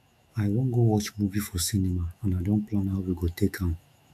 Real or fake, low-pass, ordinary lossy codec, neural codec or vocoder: fake; 14.4 kHz; AAC, 96 kbps; autoencoder, 48 kHz, 128 numbers a frame, DAC-VAE, trained on Japanese speech